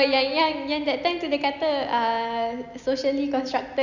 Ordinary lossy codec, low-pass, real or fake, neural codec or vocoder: none; 7.2 kHz; real; none